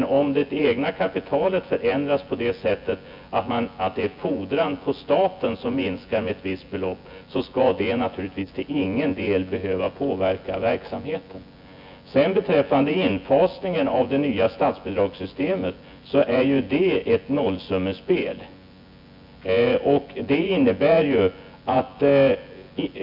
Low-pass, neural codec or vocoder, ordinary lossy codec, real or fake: 5.4 kHz; vocoder, 24 kHz, 100 mel bands, Vocos; MP3, 48 kbps; fake